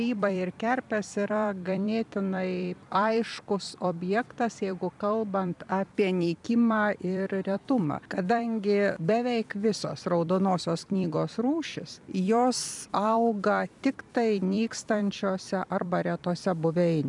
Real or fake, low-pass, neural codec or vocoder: fake; 10.8 kHz; vocoder, 44.1 kHz, 128 mel bands every 256 samples, BigVGAN v2